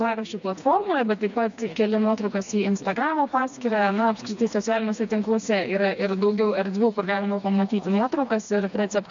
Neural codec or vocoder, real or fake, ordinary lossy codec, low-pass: codec, 16 kHz, 2 kbps, FreqCodec, smaller model; fake; MP3, 64 kbps; 7.2 kHz